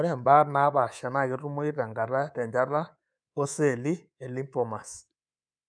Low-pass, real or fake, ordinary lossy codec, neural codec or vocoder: 9.9 kHz; fake; none; codec, 24 kHz, 3.1 kbps, DualCodec